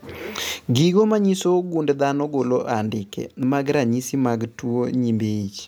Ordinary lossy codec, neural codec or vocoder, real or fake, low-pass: none; none; real; none